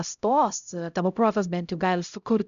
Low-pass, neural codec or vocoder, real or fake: 7.2 kHz; codec, 16 kHz, 0.5 kbps, X-Codec, HuBERT features, trained on LibriSpeech; fake